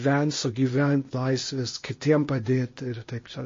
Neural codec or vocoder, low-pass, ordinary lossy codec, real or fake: codec, 16 kHz, 0.8 kbps, ZipCodec; 7.2 kHz; MP3, 32 kbps; fake